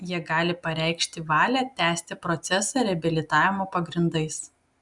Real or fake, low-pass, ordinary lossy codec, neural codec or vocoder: real; 10.8 kHz; MP3, 96 kbps; none